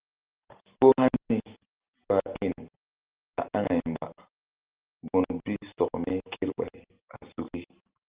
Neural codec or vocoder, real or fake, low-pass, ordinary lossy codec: none; real; 3.6 kHz; Opus, 16 kbps